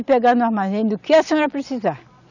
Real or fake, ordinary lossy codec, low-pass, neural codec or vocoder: real; none; 7.2 kHz; none